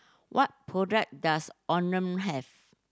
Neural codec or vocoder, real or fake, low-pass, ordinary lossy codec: none; real; none; none